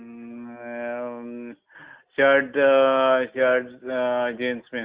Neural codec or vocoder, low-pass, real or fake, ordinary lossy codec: none; 3.6 kHz; real; Opus, 32 kbps